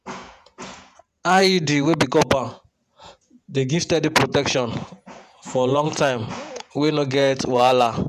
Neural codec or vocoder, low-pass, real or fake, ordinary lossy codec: vocoder, 48 kHz, 128 mel bands, Vocos; 14.4 kHz; fake; none